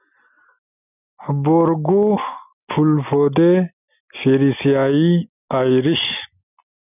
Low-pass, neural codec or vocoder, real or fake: 3.6 kHz; none; real